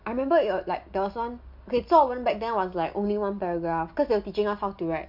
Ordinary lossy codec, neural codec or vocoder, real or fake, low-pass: none; none; real; 5.4 kHz